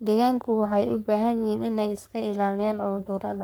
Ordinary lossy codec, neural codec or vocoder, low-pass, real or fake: none; codec, 44.1 kHz, 3.4 kbps, Pupu-Codec; none; fake